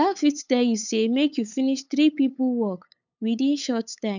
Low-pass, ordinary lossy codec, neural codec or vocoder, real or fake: 7.2 kHz; none; codec, 16 kHz, 8 kbps, FunCodec, trained on LibriTTS, 25 frames a second; fake